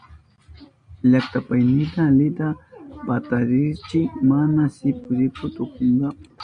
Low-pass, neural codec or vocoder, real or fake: 9.9 kHz; none; real